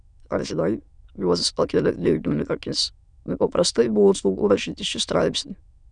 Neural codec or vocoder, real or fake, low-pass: autoencoder, 22.05 kHz, a latent of 192 numbers a frame, VITS, trained on many speakers; fake; 9.9 kHz